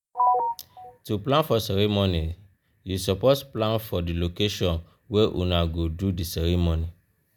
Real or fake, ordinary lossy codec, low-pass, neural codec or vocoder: real; none; none; none